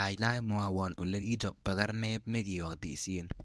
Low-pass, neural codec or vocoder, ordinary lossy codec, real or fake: none; codec, 24 kHz, 0.9 kbps, WavTokenizer, medium speech release version 1; none; fake